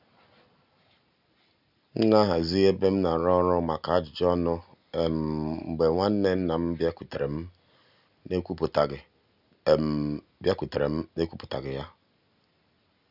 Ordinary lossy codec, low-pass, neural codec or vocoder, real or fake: none; 5.4 kHz; none; real